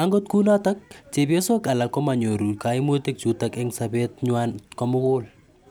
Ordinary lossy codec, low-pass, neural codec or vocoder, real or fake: none; none; none; real